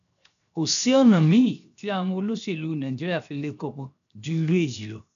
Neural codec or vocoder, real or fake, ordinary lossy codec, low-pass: codec, 16 kHz, 0.7 kbps, FocalCodec; fake; none; 7.2 kHz